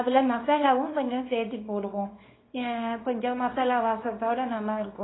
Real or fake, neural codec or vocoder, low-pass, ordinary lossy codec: fake; codec, 24 kHz, 0.9 kbps, WavTokenizer, small release; 7.2 kHz; AAC, 16 kbps